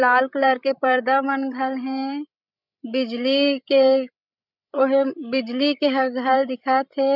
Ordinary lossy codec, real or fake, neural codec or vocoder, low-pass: none; fake; codec, 16 kHz, 16 kbps, FreqCodec, larger model; 5.4 kHz